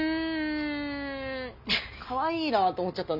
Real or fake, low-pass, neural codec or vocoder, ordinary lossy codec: real; 5.4 kHz; none; none